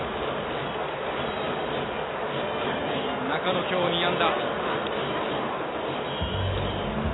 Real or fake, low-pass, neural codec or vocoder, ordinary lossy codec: real; 7.2 kHz; none; AAC, 16 kbps